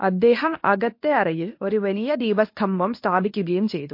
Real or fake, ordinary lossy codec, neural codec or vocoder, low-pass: fake; MP3, 32 kbps; codec, 24 kHz, 0.9 kbps, WavTokenizer, medium speech release version 1; 5.4 kHz